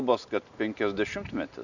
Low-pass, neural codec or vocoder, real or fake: 7.2 kHz; none; real